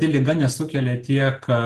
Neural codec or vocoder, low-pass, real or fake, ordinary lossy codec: none; 10.8 kHz; real; Opus, 16 kbps